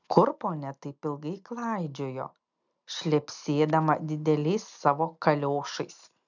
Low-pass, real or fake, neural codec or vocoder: 7.2 kHz; real; none